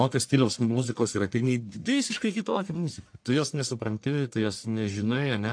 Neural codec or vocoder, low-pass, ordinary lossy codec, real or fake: codec, 44.1 kHz, 1.7 kbps, Pupu-Codec; 9.9 kHz; AAC, 64 kbps; fake